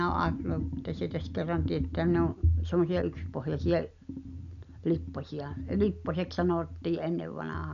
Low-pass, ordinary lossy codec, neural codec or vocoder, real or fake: 7.2 kHz; none; none; real